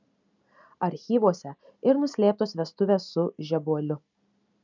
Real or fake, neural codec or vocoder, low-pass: real; none; 7.2 kHz